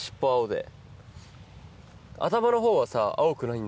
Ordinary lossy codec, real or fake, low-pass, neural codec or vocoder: none; real; none; none